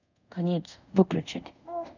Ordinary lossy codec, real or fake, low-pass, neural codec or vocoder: none; fake; 7.2 kHz; codec, 24 kHz, 0.5 kbps, DualCodec